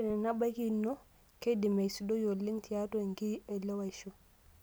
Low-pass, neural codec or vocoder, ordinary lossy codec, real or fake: none; none; none; real